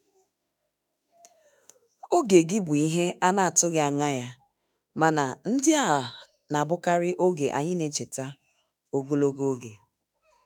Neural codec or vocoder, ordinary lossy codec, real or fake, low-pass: autoencoder, 48 kHz, 32 numbers a frame, DAC-VAE, trained on Japanese speech; none; fake; 19.8 kHz